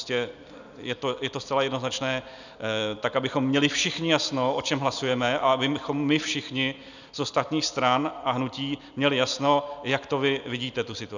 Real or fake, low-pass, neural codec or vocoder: real; 7.2 kHz; none